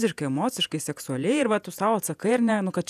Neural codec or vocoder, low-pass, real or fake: vocoder, 48 kHz, 128 mel bands, Vocos; 14.4 kHz; fake